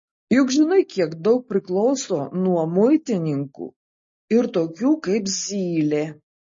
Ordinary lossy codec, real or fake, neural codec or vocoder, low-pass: MP3, 32 kbps; real; none; 7.2 kHz